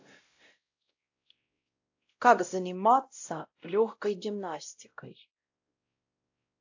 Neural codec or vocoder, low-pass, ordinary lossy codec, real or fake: codec, 16 kHz, 0.5 kbps, X-Codec, WavLM features, trained on Multilingual LibriSpeech; 7.2 kHz; AAC, 48 kbps; fake